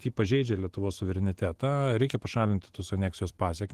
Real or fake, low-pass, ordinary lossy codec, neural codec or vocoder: fake; 14.4 kHz; Opus, 16 kbps; autoencoder, 48 kHz, 128 numbers a frame, DAC-VAE, trained on Japanese speech